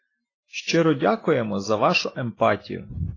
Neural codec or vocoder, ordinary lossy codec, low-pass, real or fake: none; AAC, 32 kbps; 7.2 kHz; real